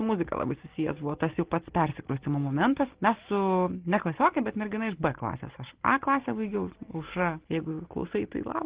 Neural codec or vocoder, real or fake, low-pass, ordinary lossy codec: none; real; 3.6 kHz; Opus, 16 kbps